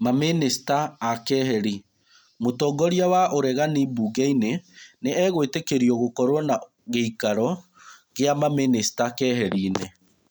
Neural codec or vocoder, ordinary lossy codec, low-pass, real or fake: none; none; none; real